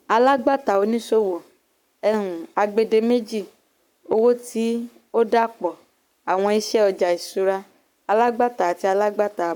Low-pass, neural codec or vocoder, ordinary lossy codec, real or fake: 19.8 kHz; codec, 44.1 kHz, 7.8 kbps, Pupu-Codec; none; fake